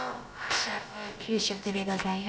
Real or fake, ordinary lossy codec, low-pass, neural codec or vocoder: fake; none; none; codec, 16 kHz, about 1 kbps, DyCAST, with the encoder's durations